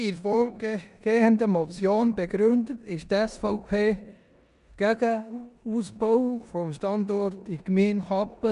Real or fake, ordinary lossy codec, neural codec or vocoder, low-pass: fake; none; codec, 16 kHz in and 24 kHz out, 0.9 kbps, LongCat-Audio-Codec, four codebook decoder; 10.8 kHz